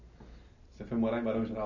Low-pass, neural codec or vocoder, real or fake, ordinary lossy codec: 7.2 kHz; none; real; none